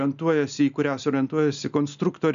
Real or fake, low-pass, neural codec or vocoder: real; 7.2 kHz; none